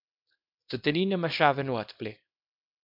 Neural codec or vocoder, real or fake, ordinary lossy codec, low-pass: codec, 24 kHz, 0.9 kbps, WavTokenizer, small release; fake; MP3, 48 kbps; 5.4 kHz